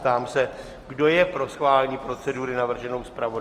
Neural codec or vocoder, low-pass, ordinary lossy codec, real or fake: none; 14.4 kHz; Opus, 24 kbps; real